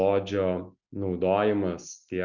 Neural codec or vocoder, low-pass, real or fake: none; 7.2 kHz; real